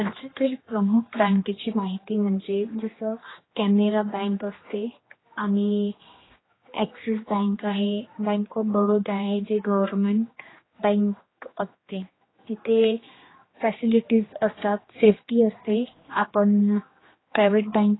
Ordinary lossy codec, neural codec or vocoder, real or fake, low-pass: AAC, 16 kbps; codec, 16 kHz, 2 kbps, X-Codec, HuBERT features, trained on general audio; fake; 7.2 kHz